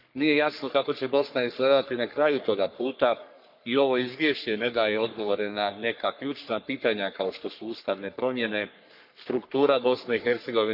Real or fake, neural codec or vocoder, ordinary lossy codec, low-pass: fake; codec, 44.1 kHz, 3.4 kbps, Pupu-Codec; none; 5.4 kHz